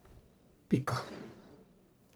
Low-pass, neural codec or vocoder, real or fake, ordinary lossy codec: none; codec, 44.1 kHz, 1.7 kbps, Pupu-Codec; fake; none